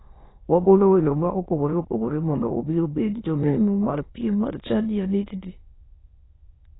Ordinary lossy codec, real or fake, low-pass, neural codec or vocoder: AAC, 16 kbps; fake; 7.2 kHz; autoencoder, 22.05 kHz, a latent of 192 numbers a frame, VITS, trained on many speakers